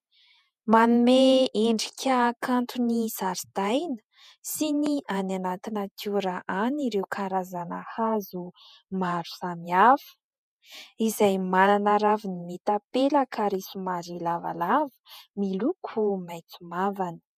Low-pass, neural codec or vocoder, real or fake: 14.4 kHz; vocoder, 48 kHz, 128 mel bands, Vocos; fake